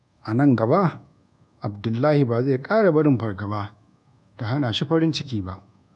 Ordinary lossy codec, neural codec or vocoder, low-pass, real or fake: none; codec, 24 kHz, 1.2 kbps, DualCodec; 10.8 kHz; fake